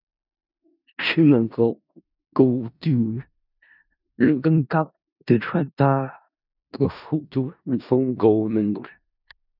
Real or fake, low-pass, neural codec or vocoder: fake; 5.4 kHz; codec, 16 kHz in and 24 kHz out, 0.4 kbps, LongCat-Audio-Codec, four codebook decoder